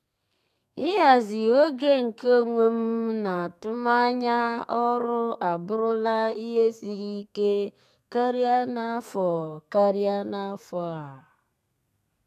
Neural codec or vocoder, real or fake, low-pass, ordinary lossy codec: codec, 32 kHz, 1.9 kbps, SNAC; fake; 14.4 kHz; none